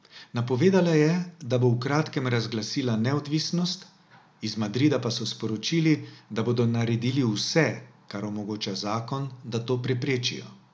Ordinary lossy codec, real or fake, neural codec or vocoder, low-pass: none; real; none; none